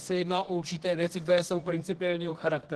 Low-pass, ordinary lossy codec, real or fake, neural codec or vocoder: 10.8 kHz; Opus, 16 kbps; fake; codec, 24 kHz, 0.9 kbps, WavTokenizer, medium music audio release